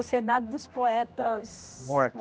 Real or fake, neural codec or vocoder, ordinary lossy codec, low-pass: fake; codec, 16 kHz, 1 kbps, X-Codec, HuBERT features, trained on general audio; none; none